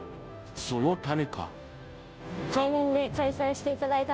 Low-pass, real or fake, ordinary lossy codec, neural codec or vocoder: none; fake; none; codec, 16 kHz, 0.5 kbps, FunCodec, trained on Chinese and English, 25 frames a second